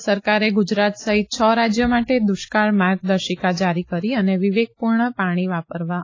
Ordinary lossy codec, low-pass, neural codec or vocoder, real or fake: AAC, 32 kbps; 7.2 kHz; none; real